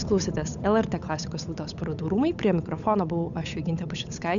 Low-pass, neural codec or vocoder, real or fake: 7.2 kHz; codec, 16 kHz, 8 kbps, FunCodec, trained on Chinese and English, 25 frames a second; fake